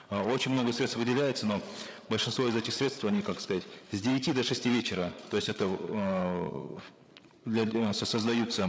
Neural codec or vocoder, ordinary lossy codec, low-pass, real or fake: codec, 16 kHz, 8 kbps, FreqCodec, larger model; none; none; fake